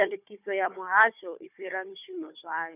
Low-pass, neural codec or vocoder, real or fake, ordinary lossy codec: 3.6 kHz; codec, 16 kHz, 4 kbps, FunCodec, trained on Chinese and English, 50 frames a second; fake; none